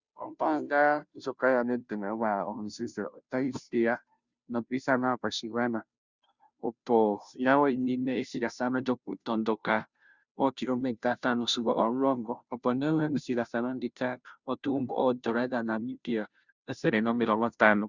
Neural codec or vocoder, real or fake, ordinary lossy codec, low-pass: codec, 16 kHz, 0.5 kbps, FunCodec, trained on Chinese and English, 25 frames a second; fake; Opus, 64 kbps; 7.2 kHz